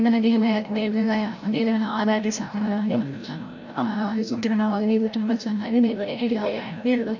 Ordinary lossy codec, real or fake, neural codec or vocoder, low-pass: none; fake; codec, 16 kHz, 0.5 kbps, FreqCodec, larger model; 7.2 kHz